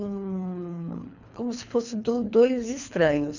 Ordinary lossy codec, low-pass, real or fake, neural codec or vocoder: none; 7.2 kHz; fake; codec, 24 kHz, 3 kbps, HILCodec